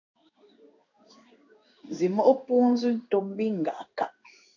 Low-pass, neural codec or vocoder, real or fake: 7.2 kHz; codec, 16 kHz in and 24 kHz out, 1 kbps, XY-Tokenizer; fake